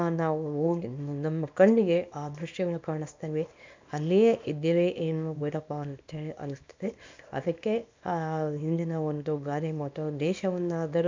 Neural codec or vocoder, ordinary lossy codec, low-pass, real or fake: codec, 24 kHz, 0.9 kbps, WavTokenizer, small release; MP3, 64 kbps; 7.2 kHz; fake